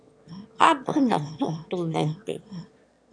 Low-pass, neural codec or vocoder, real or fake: 9.9 kHz; autoencoder, 22.05 kHz, a latent of 192 numbers a frame, VITS, trained on one speaker; fake